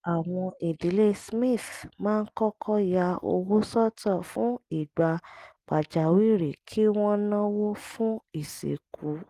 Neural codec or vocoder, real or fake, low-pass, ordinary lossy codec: none; real; 14.4 kHz; Opus, 24 kbps